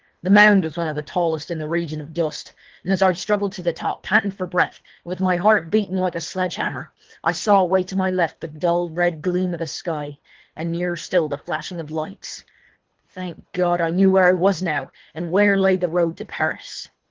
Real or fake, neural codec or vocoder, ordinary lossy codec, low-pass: fake; codec, 24 kHz, 3 kbps, HILCodec; Opus, 16 kbps; 7.2 kHz